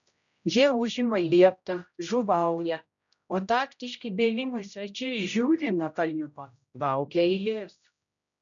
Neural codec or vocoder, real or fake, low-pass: codec, 16 kHz, 0.5 kbps, X-Codec, HuBERT features, trained on general audio; fake; 7.2 kHz